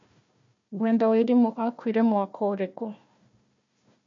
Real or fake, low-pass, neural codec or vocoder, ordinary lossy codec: fake; 7.2 kHz; codec, 16 kHz, 1 kbps, FunCodec, trained on Chinese and English, 50 frames a second; MP3, 64 kbps